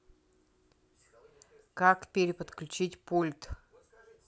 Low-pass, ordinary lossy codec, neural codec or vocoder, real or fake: none; none; none; real